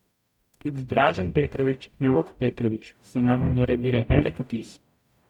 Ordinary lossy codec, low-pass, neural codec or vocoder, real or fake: none; 19.8 kHz; codec, 44.1 kHz, 0.9 kbps, DAC; fake